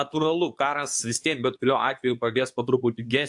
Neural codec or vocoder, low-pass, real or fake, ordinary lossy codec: codec, 24 kHz, 0.9 kbps, WavTokenizer, medium speech release version 2; 10.8 kHz; fake; AAC, 64 kbps